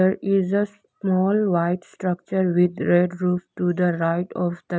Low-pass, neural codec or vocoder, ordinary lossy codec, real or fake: none; none; none; real